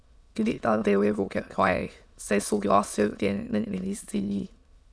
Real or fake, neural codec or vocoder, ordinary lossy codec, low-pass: fake; autoencoder, 22.05 kHz, a latent of 192 numbers a frame, VITS, trained on many speakers; none; none